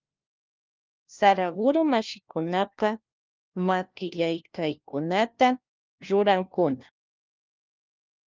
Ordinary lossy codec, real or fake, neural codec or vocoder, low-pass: Opus, 32 kbps; fake; codec, 16 kHz, 1 kbps, FunCodec, trained on LibriTTS, 50 frames a second; 7.2 kHz